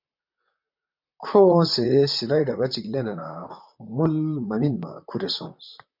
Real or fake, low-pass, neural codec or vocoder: fake; 5.4 kHz; vocoder, 44.1 kHz, 128 mel bands, Pupu-Vocoder